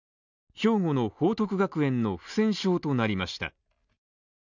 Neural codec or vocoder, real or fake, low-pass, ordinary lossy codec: none; real; 7.2 kHz; none